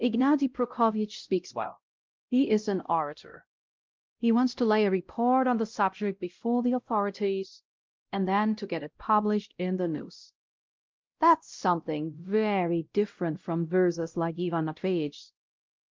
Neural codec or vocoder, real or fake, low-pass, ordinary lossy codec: codec, 16 kHz, 0.5 kbps, X-Codec, WavLM features, trained on Multilingual LibriSpeech; fake; 7.2 kHz; Opus, 32 kbps